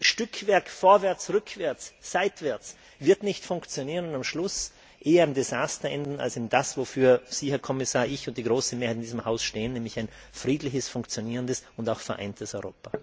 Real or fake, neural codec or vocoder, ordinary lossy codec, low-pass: real; none; none; none